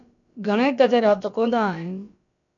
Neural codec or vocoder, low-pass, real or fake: codec, 16 kHz, about 1 kbps, DyCAST, with the encoder's durations; 7.2 kHz; fake